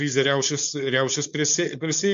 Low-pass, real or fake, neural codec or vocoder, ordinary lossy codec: 7.2 kHz; fake; codec, 16 kHz, 4.8 kbps, FACodec; MP3, 64 kbps